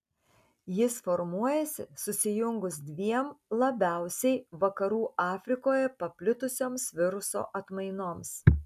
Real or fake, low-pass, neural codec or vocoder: real; 14.4 kHz; none